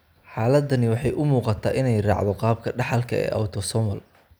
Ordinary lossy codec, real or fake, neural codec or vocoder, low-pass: none; real; none; none